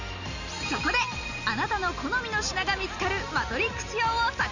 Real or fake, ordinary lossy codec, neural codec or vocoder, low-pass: real; none; none; 7.2 kHz